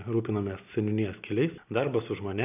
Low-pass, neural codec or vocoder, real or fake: 3.6 kHz; none; real